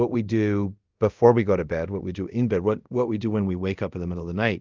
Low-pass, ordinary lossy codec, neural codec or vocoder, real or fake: 7.2 kHz; Opus, 32 kbps; codec, 24 kHz, 0.5 kbps, DualCodec; fake